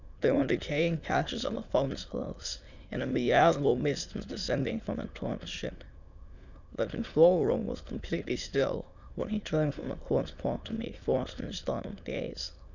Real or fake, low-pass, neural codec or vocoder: fake; 7.2 kHz; autoencoder, 22.05 kHz, a latent of 192 numbers a frame, VITS, trained on many speakers